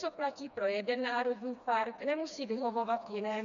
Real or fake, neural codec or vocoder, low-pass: fake; codec, 16 kHz, 2 kbps, FreqCodec, smaller model; 7.2 kHz